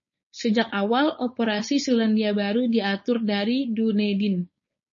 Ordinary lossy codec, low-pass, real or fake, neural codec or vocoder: MP3, 32 kbps; 7.2 kHz; fake; codec, 16 kHz, 4.8 kbps, FACodec